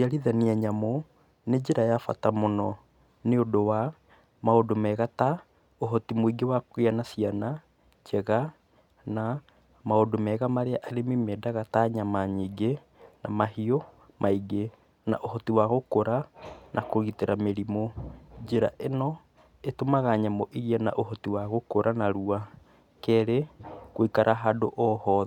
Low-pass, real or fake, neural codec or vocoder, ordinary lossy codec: 19.8 kHz; real; none; none